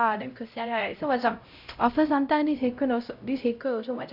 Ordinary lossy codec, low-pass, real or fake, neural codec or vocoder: none; 5.4 kHz; fake; codec, 16 kHz, 0.5 kbps, X-Codec, HuBERT features, trained on LibriSpeech